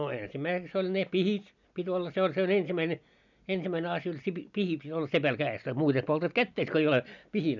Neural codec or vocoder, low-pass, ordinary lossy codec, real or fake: none; 7.2 kHz; none; real